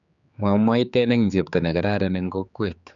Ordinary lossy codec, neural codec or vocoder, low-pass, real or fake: none; codec, 16 kHz, 4 kbps, X-Codec, HuBERT features, trained on general audio; 7.2 kHz; fake